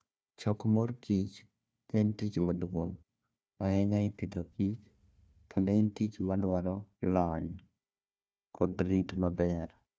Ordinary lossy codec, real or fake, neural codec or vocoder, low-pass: none; fake; codec, 16 kHz, 1 kbps, FunCodec, trained on Chinese and English, 50 frames a second; none